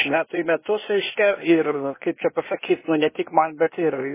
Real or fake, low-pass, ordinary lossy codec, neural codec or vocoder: fake; 3.6 kHz; MP3, 16 kbps; codec, 16 kHz, 0.8 kbps, ZipCodec